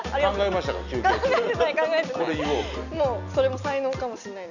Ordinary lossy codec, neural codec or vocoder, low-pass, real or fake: none; none; 7.2 kHz; real